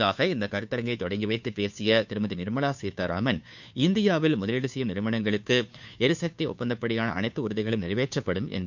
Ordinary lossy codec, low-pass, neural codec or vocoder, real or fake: none; 7.2 kHz; codec, 16 kHz, 2 kbps, FunCodec, trained on Chinese and English, 25 frames a second; fake